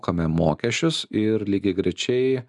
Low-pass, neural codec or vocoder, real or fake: 10.8 kHz; none; real